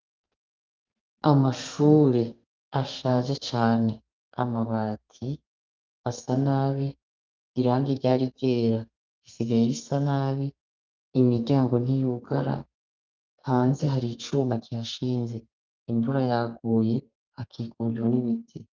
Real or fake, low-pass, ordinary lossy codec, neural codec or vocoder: fake; 7.2 kHz; Opus, 24 kbps; codec, 32 kHz, 1.9 kbps, SNAC